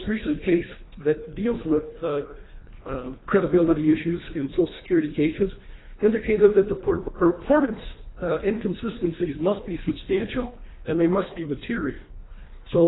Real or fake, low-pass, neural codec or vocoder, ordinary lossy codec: fake; 7.2 kHz; codec, 24 kHz, 1.5 kbps, HILCodec; AAC, 16 kbps